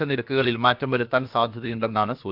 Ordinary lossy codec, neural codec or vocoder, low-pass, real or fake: none; codec, 16 kHz, about 1 kbps, DyCAST, with the encoder's durations; 5.4 kHz; fake